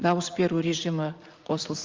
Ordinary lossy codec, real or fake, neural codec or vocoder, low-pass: Opus, 32 kbps; fake; vocoder, 44.1 kHz, 80 mel bands, Vocos; 7.2 kHz